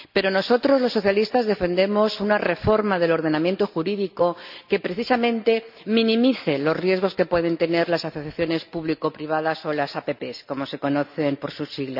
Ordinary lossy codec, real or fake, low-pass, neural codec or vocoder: none; real; 5.4 kHz; none